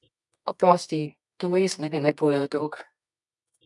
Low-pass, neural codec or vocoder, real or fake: 10.8 kHz; codec, 24 kHz, 0.9 kbps, WavTokenizer, medium music audio release; fake